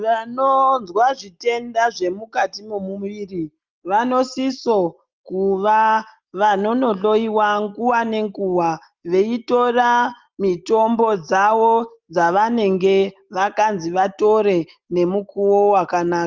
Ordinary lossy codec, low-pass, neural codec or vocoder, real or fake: Opus, 32 kbps; 7.2 kHz; none; real